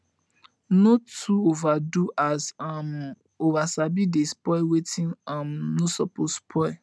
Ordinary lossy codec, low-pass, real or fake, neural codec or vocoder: none; none; real; none